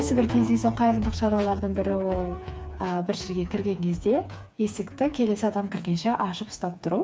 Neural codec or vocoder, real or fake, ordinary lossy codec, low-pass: codec, 16 kHz, 4 kbps, FreqCodec, smaller model; fake; none; none